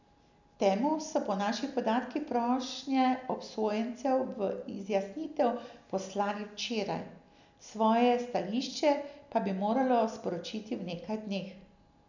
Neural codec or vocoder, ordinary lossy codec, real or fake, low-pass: none; none; real; 7.2 kHz